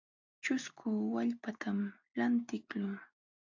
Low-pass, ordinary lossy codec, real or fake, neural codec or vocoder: 7.2 kHz; AAC, 48 kbps; real; none